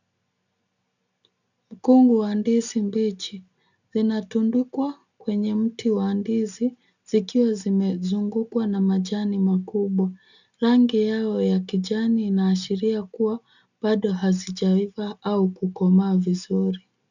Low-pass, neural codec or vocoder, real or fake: 7.2 kHz; none; real